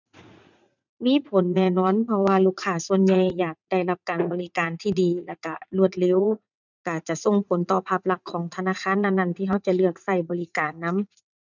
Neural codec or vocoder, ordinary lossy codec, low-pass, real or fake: vocoder, 44.1 kHz, 80 mel bands, Vocos; none; 7.2 kHz; fake